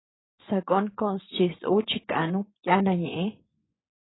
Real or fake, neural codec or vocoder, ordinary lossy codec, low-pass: fake; vocoder, 24 kHz, 100 mel bands, Vocos; AAC, 16 kbps; 7.2 kHz